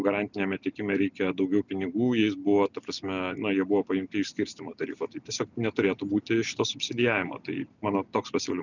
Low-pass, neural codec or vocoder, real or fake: 7.2 kHz; none; real